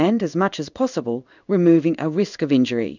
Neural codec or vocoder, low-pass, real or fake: codec, 16 kHz in and 24 kHz out, 1 kbps, XY-Tokenizer; 7.2 kHz; fake